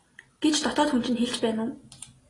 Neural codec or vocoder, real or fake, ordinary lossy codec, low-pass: none; real; AAC, 32 kbps; 10.8 kHz